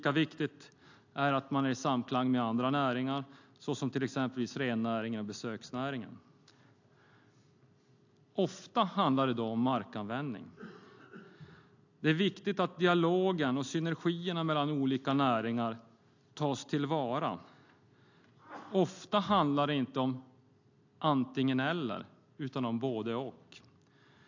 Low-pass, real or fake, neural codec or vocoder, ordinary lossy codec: 7.2 kHz; real; none; AAC, 48 kbps